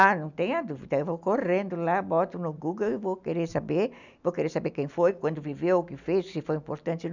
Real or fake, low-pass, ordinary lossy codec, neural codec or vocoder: real; 7.2 kHz; none; none